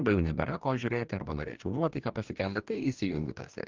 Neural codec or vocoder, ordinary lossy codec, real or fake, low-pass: codec, 44.1 kHz, 2.6 kbps, DAC; Opus, 24 kbps; fake; 7.2 kHz